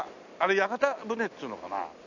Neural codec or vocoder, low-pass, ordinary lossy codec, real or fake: none; 7.2 kHz; none; real